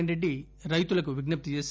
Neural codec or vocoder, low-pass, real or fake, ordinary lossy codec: none; none; real; none